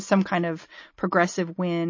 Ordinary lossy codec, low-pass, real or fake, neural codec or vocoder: MP3, 32 kbps; 7.2 kHz; real; none